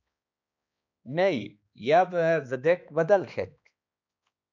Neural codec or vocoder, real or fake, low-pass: codec, 16 kHz, 2 kbps, X-Codec, HuBERT features, trained on balanced general audio; fake; 7.2 kHz